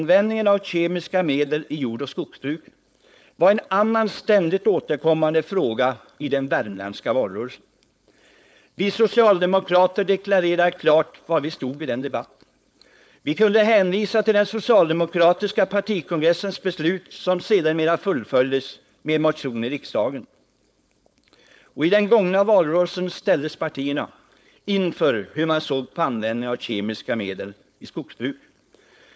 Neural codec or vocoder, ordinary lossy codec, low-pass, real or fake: codec, 16 kHz, 4.8 kbps, FACodec; none; none; fake